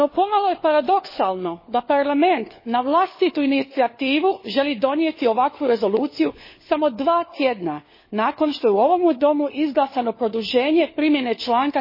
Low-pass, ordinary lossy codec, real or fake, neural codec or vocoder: 5.4 kHz; MP3, 24 kbps; fake; codec, 16 kHz, 4 kbps, FunCodec, trained on LibriTTS, 50 frames a second